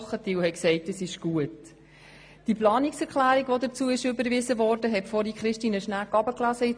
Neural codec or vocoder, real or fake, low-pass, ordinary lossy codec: none; real; 9.9 kHz; MP3, 64 kbps